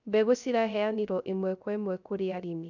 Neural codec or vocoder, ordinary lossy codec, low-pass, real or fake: codec, 16 kHz, 0.3 kbps, FocalCodec; none; 7.2 kHz; fake